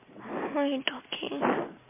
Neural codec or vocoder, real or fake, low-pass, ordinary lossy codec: none; real; 3.6 kHz; MP3, 24 kbps